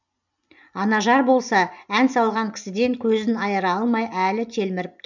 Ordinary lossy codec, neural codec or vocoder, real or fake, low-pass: none; none; real; 7.2 kHz